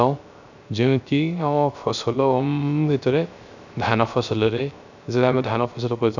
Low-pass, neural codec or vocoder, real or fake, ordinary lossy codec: 7.2 kHz; codec, 16 kHz, 0.3 kbps, FocalCodec; fake; none